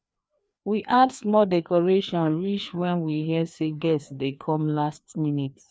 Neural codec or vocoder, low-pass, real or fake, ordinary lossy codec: codec, 16 kHz, 2 kbps, FreqCodec, larger model; none; fake; none